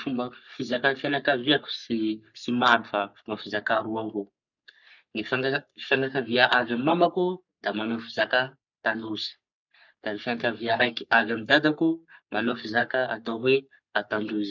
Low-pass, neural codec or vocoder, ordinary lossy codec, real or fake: 7.2 kHz; codec, 44.1 kHz, 3.4 kbps, Pupu-Codec; none; fake